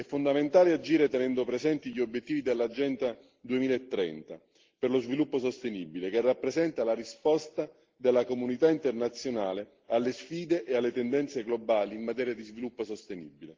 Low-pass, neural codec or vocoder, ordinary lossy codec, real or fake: 7.2 kHz; none; Opus, 16 kbps; real